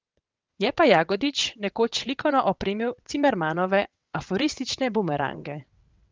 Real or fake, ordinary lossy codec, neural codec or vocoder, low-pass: fake; Opus, 24 kbps; vocoder, 22.05 kHz, 80 mel bands, WaveNeXt; 7.2 kHz